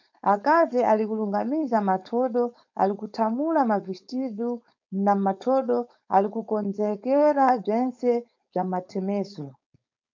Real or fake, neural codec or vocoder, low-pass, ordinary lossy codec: fake; codec, 16 kHz, 4.8 kbps, FACodec; 7.2 kHz; MP3, 64 kbps